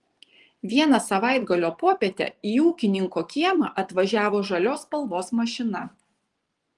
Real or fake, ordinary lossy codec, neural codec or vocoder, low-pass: fake; Opus, 24 kbps; vocoder, 24 kHz, 100 mel bands, Vocos; 10.8 kHz